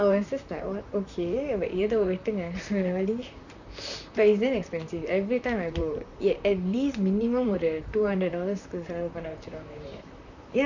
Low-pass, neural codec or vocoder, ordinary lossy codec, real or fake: 7.2 kHz; vocoder, 44.1 kHz, 128 mel bands, Pupu-Vocoder; none; fake